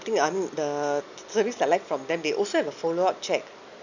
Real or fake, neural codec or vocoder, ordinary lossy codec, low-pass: real; none; none; 7.2 kHz